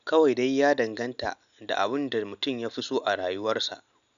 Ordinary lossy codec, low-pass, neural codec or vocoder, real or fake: none; 7.2 kHz; none; real